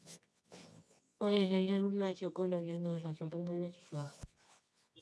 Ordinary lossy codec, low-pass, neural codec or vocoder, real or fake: none; none; codec, 24 kHz, 0.9 kbps, WavTokenizer, medium music audio release; fake